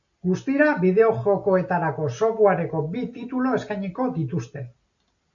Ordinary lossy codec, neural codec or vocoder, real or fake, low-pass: AAC, 64 kbps; none; real; 7.2 kHz